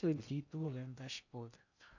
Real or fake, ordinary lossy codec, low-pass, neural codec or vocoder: fake; none; 7.2 kHz; codec, 16 kHz in and 24 kHz out, 0.6 kbps, FocalCodec, streaming, 2048 codes